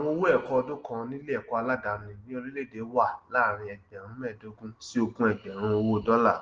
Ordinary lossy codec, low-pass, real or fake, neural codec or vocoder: Opus, 16 kbps; 7.2 kHz; real; none